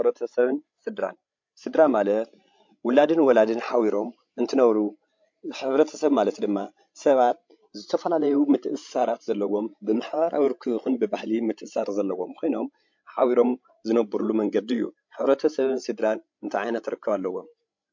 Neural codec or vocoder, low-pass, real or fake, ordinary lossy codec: codec, 16 kHz, 16 kbps, FreqCodec, larger model; 7.2 kHz; fake; MP3, 48 kbps